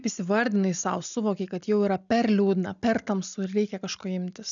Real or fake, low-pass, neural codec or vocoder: real; 7.2 kHz; none